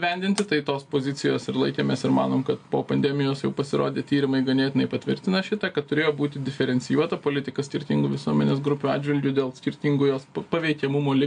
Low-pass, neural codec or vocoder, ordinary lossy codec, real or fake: 9.9 kHz; none; AAC, 64 kbps; real